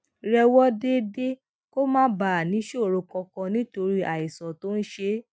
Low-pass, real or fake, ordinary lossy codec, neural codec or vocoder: none; real; none; none